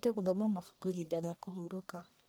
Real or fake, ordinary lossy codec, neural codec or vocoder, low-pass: fake; none; codec, 44.1 kHz, 1.7 kbps, Pupu-Codec; none